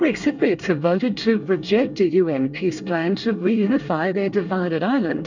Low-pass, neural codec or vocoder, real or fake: 7.2 kHz; codec, 24 kHz, 1 kbps, SNAC; fake